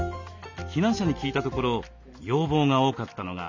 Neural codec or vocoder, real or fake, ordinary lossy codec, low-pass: none; real; MP3, 64 kbps; 7.2 kHz